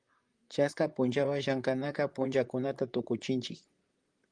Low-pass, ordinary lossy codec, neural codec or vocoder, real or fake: 9.9 kHz; Opus, 32 kbps; vocoder, 44.1 kHz, 128 mel bands, Pupu-Vocoder; fake